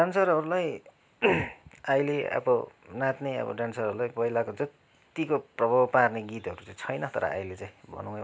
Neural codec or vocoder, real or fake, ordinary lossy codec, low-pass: none; real; none; none